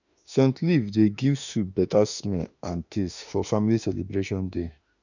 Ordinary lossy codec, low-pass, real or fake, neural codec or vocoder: none; 7.2 kHz; fake; autoencoder, 48 kHz, 32 numbers a frame, DAC-VAE, trained on Japanese speech